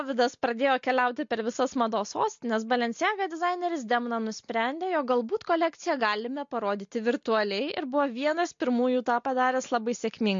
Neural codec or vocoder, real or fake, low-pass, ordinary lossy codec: none; real; 7.2 kHz; MP3, 48 kbps